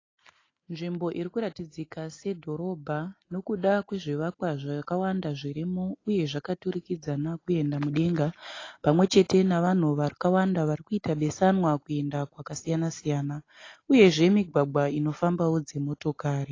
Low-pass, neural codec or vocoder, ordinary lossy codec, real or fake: 7.2 kHz; none; AAC, 32 kbps; real